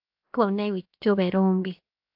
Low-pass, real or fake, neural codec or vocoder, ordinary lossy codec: 5.4 kHz; fake; codec, 16 kHz, 0.7 kbps, FocalCodec; AAC, 48 kbps